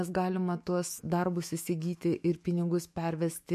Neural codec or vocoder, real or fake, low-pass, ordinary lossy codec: autoencoder, 48 kHz, 128 numbers a frame, DAC-VAE, trained on Japanese speech; fake; 14.4 kHz; MP3, 64 kbps